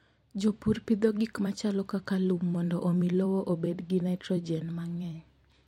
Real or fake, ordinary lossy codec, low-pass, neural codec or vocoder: fake; MP3, 64 kbps; 19.8 kHz; vocoder, 44.1 kHz, 128 mel bands every 256 samples, BigVGAN v2